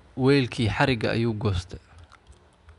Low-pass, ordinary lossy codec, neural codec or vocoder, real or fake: 10.8 kHz; none; none; real